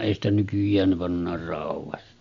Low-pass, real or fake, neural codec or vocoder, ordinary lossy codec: 7.2 kHz; real; none; MP3, 64 kbps